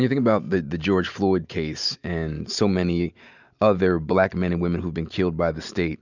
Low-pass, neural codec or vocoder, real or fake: 7.2 kHz; none; real